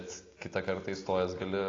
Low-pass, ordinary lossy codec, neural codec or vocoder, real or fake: 7.2 kHz; AAC, 32 kbps; none; real